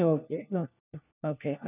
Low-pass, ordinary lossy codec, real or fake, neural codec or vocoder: 3.6 kHz; none; fake; codec, 16 kHz, 1 kbps, FunCodec, trained on LibriTTS, 50 frames a second